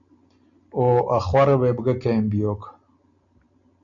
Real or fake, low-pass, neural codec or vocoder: real; 7.2 kHz; none